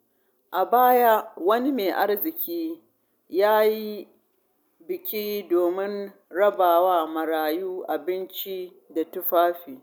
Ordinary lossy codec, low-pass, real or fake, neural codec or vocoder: none; none; real; none